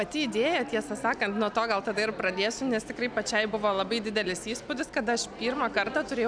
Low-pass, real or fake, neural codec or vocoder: 9.9 kHz; real; none